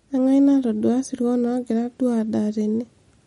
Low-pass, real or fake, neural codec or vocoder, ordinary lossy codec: 19.8 kHz; real; none; MP3, 48 kbps